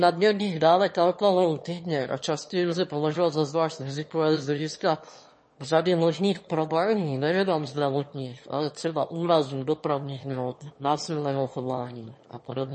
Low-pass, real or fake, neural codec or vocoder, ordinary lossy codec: 9.9 kHz; fake; autoencoder, 22.05 kHz, a latent of 192 numbers a frame, VITS, trained on one speaker; MP3, 32 kbps